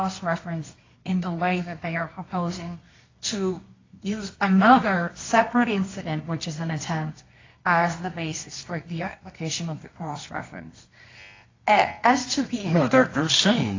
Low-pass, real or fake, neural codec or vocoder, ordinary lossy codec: 7.2 kHz; fake; codec, 24 kHz, 0.9 kbps, WavTokenizer, medium music audio release; AAC, 32 kbps